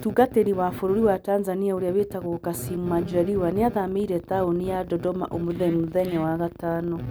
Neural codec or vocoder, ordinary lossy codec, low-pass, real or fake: none; none; none; real